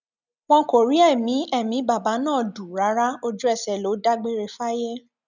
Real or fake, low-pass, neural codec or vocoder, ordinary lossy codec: real; 7.2 kHz; none; none